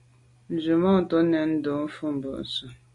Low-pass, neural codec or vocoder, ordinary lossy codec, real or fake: 10.8 kHz; none; MP3, 48 kbps; real